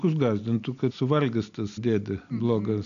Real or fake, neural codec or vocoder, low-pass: real; none; 7.2 kHz